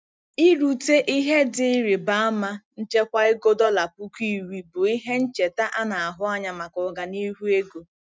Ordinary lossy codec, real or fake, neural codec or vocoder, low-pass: none; real; none; none